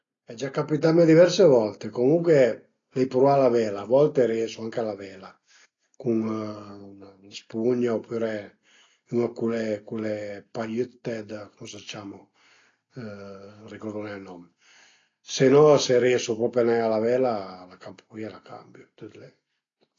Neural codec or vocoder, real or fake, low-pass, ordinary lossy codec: none; real; 7.2 kHz; AAC, 32 kbps